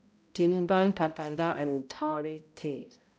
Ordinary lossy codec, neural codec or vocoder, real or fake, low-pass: none; codec, 16 kHz, 0.5 kbps, X-Codec, HuBERT features, trained on balanced general audio; fake; none